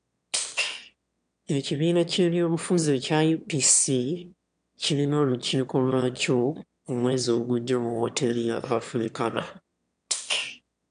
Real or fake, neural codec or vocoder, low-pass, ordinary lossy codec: fake; autoencoder, 22.05 kHz, a latent of 192 numbers a frame, VITS, trained on one speaker; 9.9 kHz; MP3, 96 kbps